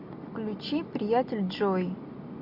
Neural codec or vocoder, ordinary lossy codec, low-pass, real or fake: none; AAC, 48 kbps; 5.4 kHz; real